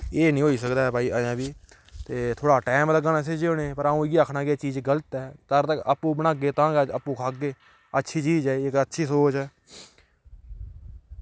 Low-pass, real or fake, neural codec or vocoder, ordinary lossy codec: none; real; none; none